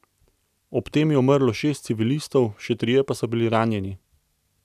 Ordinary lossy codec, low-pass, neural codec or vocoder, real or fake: none; 14.4 kHz; none; real